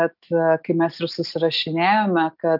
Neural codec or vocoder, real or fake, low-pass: none; real; 5.4 kHz